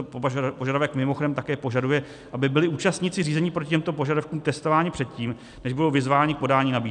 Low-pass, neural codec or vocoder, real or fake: 10.8 kHz; none; real